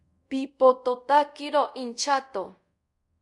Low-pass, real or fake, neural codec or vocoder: 10.8 kHz; fake; codec, 24 kHz, 0.5 kbps, DualCodec